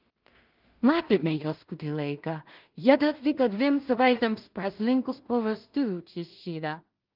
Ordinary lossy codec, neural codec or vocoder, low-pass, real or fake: Opus, 24 kbps; codec, 16 kHz in and 24 kHz out, 0.4 kbps, LongCat-Audio-Codec, two codebook decoder; 5.4 kHz; fake